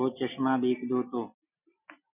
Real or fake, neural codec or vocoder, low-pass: real; none; 3.6 kHz